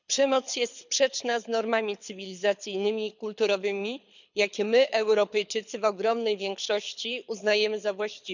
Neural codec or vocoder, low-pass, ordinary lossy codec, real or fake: codec, 24 kHz, 6 kbps, HILCodec; 7.2 kHz; none; fake